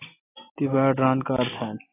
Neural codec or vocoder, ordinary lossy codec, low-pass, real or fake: none; AAC, 16 kbps; 3.6 kHz; real